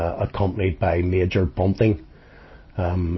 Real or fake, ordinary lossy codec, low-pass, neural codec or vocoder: real; MP3, 24 kbps; 7.2 kHz; none